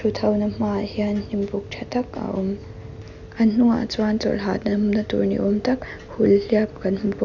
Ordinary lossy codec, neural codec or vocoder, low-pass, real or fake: none; none; 7.2 kHz; real